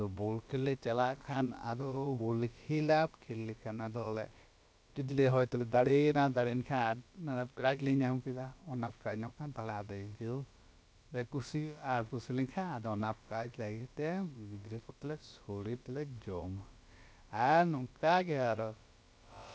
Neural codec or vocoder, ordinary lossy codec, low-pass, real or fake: codec, 16 kHz, about 1 kbps, DyCAST, with the encoder's durations; none; none; fake